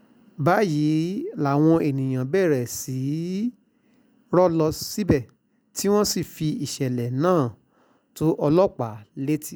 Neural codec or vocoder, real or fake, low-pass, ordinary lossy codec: none; real; none; none